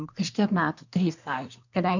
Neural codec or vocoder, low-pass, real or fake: codec, 24 kHz, 1 kbps, SNAC; 7.2 kHz; fake